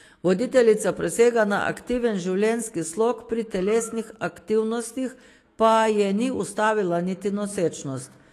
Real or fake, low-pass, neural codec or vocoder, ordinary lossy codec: fake; 14.4 kHz; autoencoder, 48 kHz, 128 numbers a frame, DAC-VAE, trained on Japanese speech; AAC, 48 kbps